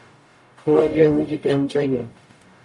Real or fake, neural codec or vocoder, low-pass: fake; codec, 44.1 kHz, 0.9 kbps, DAC; 10.8 kHz